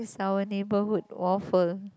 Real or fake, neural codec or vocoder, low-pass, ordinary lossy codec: real; none; none; none